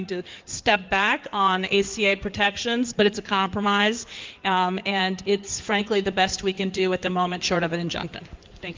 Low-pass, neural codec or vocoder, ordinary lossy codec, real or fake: 7.2 kHz; codec, 16 kHz in and 24 kHz out, 2.2 kbps, FireRedTTS-2 codec; Opus, 24 kbps; fake